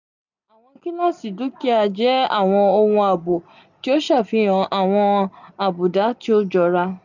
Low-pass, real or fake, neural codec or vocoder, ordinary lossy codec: 7.2 kHz; real; none; none